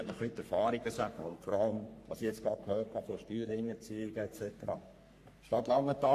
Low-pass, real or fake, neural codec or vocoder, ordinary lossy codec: 14.4 kHz; fake; codec, 44.1 kHz, 3.4 kbps, Pupu-Codec; AAC, 64 kbps